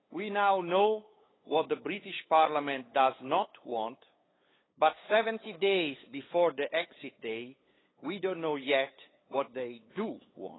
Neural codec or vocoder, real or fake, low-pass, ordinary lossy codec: codec, 16 kHz, 8 kbps, FunCodec, trained on LibriTTS, 25 frames a second; fake; 7.2 kHz; AAC, 16 kbps